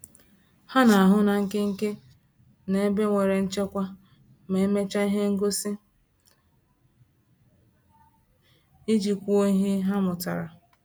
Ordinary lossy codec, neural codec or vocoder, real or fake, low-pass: none; none; real; none